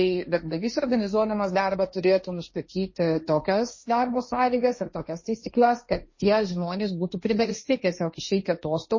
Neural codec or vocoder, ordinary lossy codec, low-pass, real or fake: codec, 16 kHz, 1.1 kbps, Voila-Tokenizer; MP3, 32 kbps; 7.2 kHz; fake